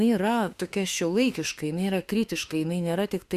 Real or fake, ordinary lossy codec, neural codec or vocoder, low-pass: fake; Opus, 64 kbps; autoencoder, 48 kHz, 32 numbers a frame, DAC-VAE, trained on Japanese speech; 14.4 kHz